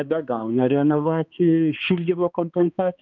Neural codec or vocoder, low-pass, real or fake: codec, 16 kHz, 2 kbps, X-Codec, HuBERT features, trained on balanced general audio; 7.2 kHz; fake